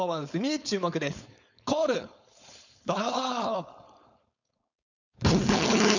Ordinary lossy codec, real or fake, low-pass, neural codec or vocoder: none; fake; 7.2 kHz; codec, 16 kHz, 4.8 kbps, FACodec